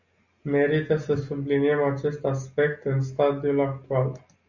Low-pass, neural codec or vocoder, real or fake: 7.2 kHz; none; real